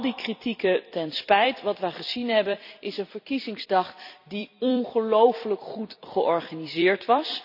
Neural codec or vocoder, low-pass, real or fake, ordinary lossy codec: vocoder, 44.1 kHz, 128 mel bands every 256 samples, BigVGAN v2; 5.4 kHz; fake; none